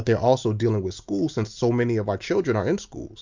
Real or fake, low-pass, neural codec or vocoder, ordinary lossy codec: real; 7.2 kHz; none; MP3, 64 kbps